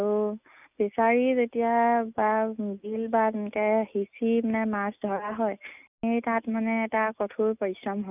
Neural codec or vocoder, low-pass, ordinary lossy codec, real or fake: none; 3.6 kHz; none; real